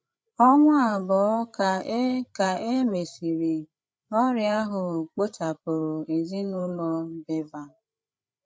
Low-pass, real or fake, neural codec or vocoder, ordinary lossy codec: none; fake; codec, 16 kHz, 8 kbps, FreqCodec, larger model; none